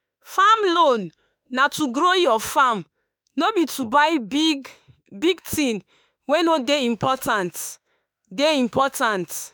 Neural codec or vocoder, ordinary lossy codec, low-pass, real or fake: autoencoder, 48 kHz, 32 numbers a frame, DAC-VAE, trained on Japanese speech; none; none; fake